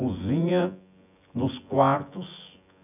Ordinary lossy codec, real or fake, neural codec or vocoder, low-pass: none; fake; vocoder, 24 kHz, 100 mel bands, Vocos; 3.6 kHz